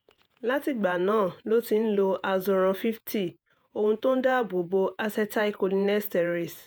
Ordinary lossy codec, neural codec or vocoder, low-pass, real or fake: none; vocoder, 48 kHz, 128 mel bands, Vocos; none; fake